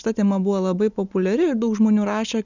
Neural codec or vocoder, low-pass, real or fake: none; 7.2 kHz; real